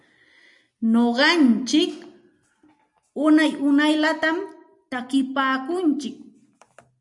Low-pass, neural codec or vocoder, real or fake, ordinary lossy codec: 10.8 kHz; none; real; MP3, 96 kbps